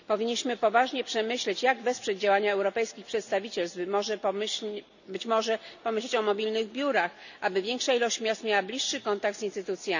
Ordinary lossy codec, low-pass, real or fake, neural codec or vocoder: none; 7.2 kHz; real; none